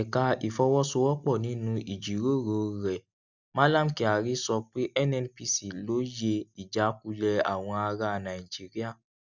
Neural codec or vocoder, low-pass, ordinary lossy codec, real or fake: none; 7.2 kHz; none; real